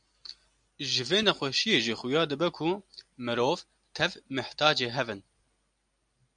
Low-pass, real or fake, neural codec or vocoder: 9.9 kHz; real; none